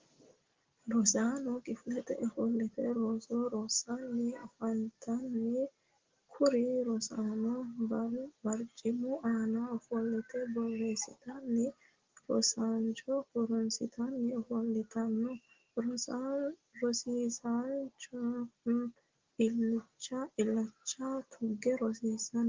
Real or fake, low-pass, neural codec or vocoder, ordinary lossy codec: real; 7.2 kHz; none; Opus, 16 kbps